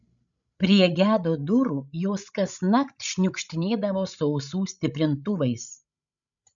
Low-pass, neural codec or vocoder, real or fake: 7.2 kHz; codec, 16 kHz, 16 kbps, FreqCodec, larger model; fake